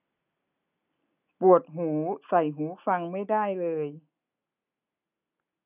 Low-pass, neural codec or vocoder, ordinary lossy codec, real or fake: 3.6 kHz; none; none; real